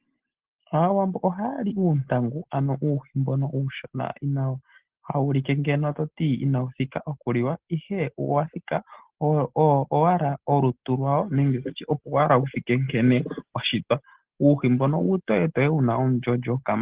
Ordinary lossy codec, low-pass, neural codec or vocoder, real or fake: Opus, 16 kbps; 3.6 kHz; none; real